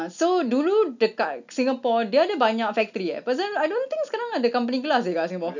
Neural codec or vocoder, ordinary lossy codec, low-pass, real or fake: none; none; 7.2 kHz; real